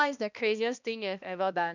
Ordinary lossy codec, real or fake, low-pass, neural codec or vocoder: none; fake; 7.2 kHz; codec, 16 kHz, 1 kbps, X-Codec, HuBERT features, trained on balanced general audio